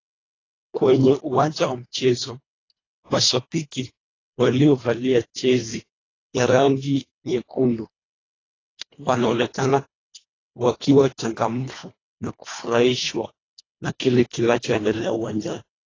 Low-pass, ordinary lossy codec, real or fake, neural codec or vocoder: 7.2 kHz; AAC, 32 kbps; fake; codec, 24 kHz, 1.5 kbps, HILCodec